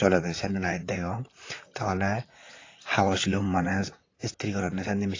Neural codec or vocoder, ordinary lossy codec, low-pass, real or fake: vocoder, 44.1 kHz, 128 mel bands, Pupu-Vocoder; AAC, 32 kbps; 7.2 kHz; fake